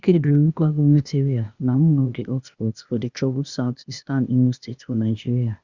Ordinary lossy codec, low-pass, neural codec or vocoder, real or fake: Opus, 64 kbps; 7.2 kHz; codec, 16 kHz, 0.8 kbps, ZipCodec; fake